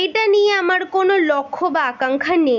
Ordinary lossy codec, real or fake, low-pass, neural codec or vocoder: none; real; 7.2 kHz; none